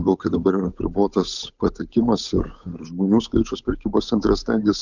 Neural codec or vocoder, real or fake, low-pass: codec, 16 kHz, 8 kbps, FunCodec, trained on Chinese and English, 25 frames a second; fake; 7.2 kHz